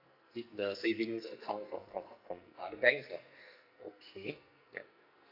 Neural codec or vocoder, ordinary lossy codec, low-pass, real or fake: codec, 44.1 kHz, 2.6 kbps, SNAC; none; 5.4 kHz; fake